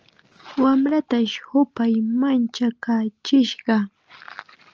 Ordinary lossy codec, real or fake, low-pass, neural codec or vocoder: Opus, 24 kbps; real; 7.2 kHz; none